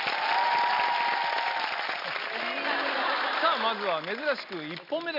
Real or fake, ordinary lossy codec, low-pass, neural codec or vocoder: real; none; 5.4 kHz; none